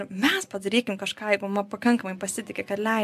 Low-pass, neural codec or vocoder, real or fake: 14.4 kHz; none; real